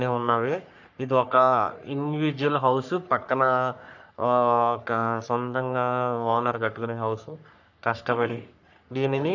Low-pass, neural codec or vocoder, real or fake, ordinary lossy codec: 7.2 kHz; codec, 44.1 kHz, 3.4 kbps, Pupu-Codec; fake; none